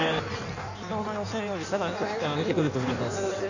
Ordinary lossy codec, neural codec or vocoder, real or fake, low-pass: none; codec, 16 kHz in and 24 kHz out, 1.1 kbps, FireRedTTS-2 codec; fake; 7.2 kHz